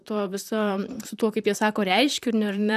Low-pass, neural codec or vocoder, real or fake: 14.4 kHz; vocoder, 44.1 kHz, 128 mel bands, Pupu-Vocoder; fake